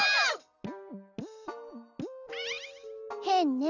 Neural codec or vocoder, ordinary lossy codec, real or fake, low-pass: none; none; real; 7.2 kHz